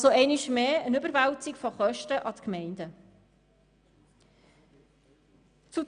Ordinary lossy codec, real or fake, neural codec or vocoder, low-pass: MP3, 96 kbps; real; none; 9.9 kHz